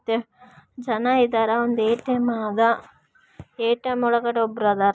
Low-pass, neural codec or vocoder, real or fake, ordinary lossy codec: none; none; real; none